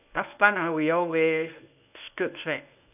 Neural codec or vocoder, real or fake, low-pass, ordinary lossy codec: codec, 24 kHz, 0.9 kbps, WavTokenizer, medium speech release version 1; fake; 3.6 kHz; none